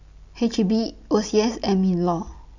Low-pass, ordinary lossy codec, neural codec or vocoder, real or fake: 7.2 kHz; none; none; real